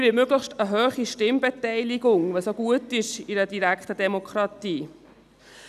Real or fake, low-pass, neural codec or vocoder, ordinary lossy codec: real; 14.4 kHz; none; none